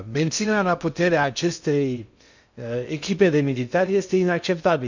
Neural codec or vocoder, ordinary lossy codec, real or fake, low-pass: codec, 16 kHz in and 24 kHz out, 0.6 kbps, FocalCodec, streaming, 2048 codes; none; fake; 7.2 kHz